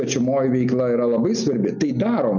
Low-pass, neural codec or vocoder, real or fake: 7.2 kHz; none; real